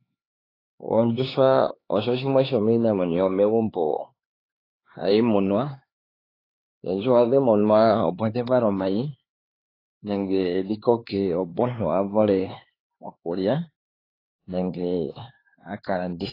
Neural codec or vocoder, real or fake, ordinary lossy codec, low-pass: codec, 16 kHz, 4 kbps, X-Codec, HuBERT features, trained on LibriSpeech; fake; AAC, 24 kbps; 5.4 kHz